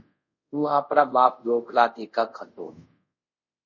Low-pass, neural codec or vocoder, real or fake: 7.2 kHz; codec, 24 kHz, 0.5 kbps, DualCodec; fake